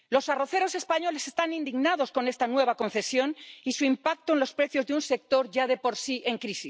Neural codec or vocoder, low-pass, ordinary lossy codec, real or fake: none; none; none; real